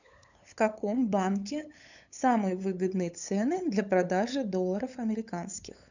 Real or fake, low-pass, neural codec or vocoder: fake; 7.2 kHz; codec, 16 kHz, 8 kbps, FunCodec, trained on LibriTTS, 25 frames a second